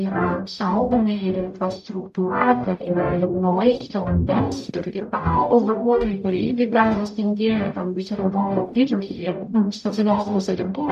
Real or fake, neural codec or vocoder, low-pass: fake; codec, 44.1 kHz, 0.9 kbps, DAC; 14.4 kHz